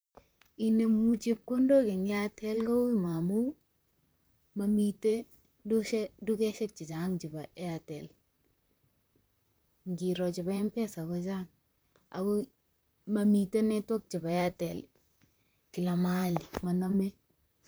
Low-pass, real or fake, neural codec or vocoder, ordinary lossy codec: none; fake; vocoder, 44.1 kHz, 128 mel bands, Pupu-Vocoder; none